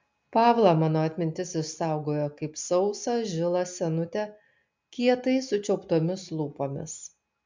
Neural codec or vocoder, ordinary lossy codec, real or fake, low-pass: none; MP3, 64 kbps; real; 7.2 kHz